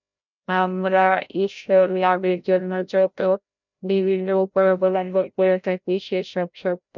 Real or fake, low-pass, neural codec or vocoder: fake; 7.2 kHz; codec, 16 kHz, 0.5 kbps, FreqCodec, larger model